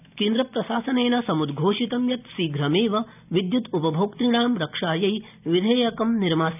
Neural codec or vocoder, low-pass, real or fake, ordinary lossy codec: none; 3.6 kHz; real; none